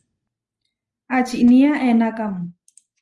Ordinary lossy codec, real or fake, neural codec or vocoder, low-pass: Opus, 24 kbps; real; none; 10.8 kHz